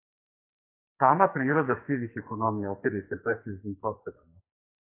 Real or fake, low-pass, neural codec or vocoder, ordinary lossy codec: fake; 3.6 kHz; codec, 44.1 kHz, 2.6 kbps, SNAC; AAC, 24 kbps